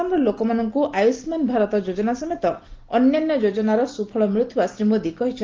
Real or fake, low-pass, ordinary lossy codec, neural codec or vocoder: real; 7.2 kHz; Opus, 16 kbps; none